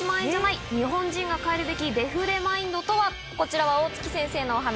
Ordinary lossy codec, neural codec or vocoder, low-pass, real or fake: none; none; none; real